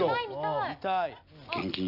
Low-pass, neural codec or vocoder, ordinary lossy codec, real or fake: 5.4 kHz; none; none; real